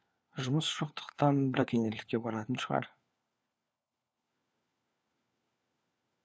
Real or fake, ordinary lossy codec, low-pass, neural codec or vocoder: fake; none; none; codec, 16 kHz, 4 kbps, FunCodec, trained on LibriTTS, 50 frames a second